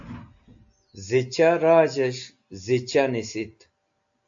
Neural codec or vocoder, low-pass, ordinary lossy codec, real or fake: none; 7.2 kHz; AAC, 64 kbps; real